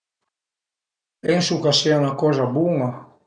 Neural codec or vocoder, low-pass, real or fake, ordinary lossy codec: none; 9.9 kHz; real; none